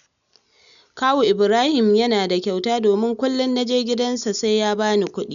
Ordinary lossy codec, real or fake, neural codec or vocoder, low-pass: none; real; none; 7.2 kHz